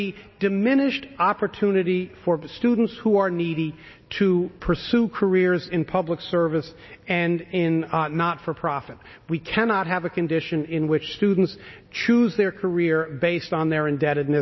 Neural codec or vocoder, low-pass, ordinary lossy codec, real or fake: none; 7.2 kHz; MP3, 24 kbps; real